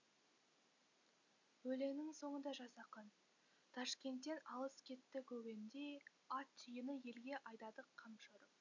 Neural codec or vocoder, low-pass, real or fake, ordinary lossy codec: none; 7.2 kHz; real; none